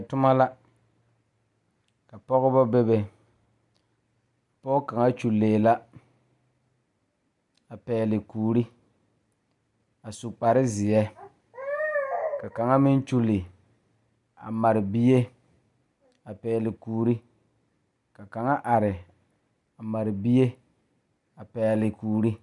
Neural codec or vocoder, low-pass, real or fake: none; 10.8 kHz; real